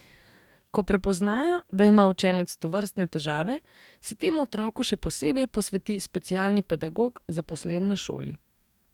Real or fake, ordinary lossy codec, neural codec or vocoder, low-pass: fake; none; codec, 44.1 kHz, 2.6 kbps, DAC; 19.8 kHz